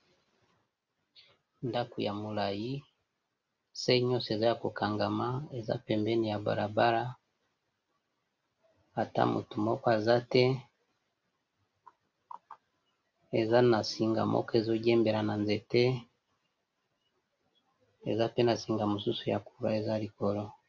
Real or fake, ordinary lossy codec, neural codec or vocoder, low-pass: real; Opus, 64 kbps; none; 7.2 kHz